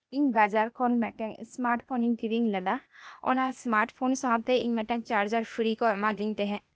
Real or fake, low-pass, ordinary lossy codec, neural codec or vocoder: fake; none; none; codec, 16 kHz, 0.8 kbps, ZipCodec